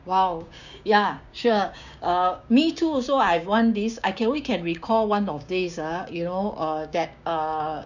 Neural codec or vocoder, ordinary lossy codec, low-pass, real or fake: codec, 16 kHz, 6 kbps, DAC; none; 7.2 kHz; fake